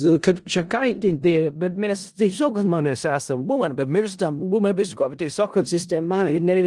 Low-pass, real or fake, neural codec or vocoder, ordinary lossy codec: 10.8 kHz; fake; codec, 16 kHz in and 24 kHz out, 0.4 kbps, LongCat-Audio-Codec, four codebook decoder; Opus, 24 kbps